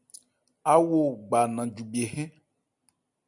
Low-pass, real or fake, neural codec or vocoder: 10.8 kHz; real; none